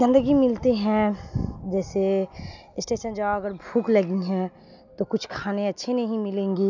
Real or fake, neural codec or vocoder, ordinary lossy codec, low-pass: real; none; none; 7.2 kHz